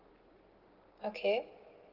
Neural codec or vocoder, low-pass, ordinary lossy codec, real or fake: none; 5.4 kHz; Opus, 16 kbps; real